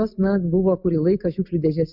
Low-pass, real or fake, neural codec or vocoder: 5.4 kHz; real; none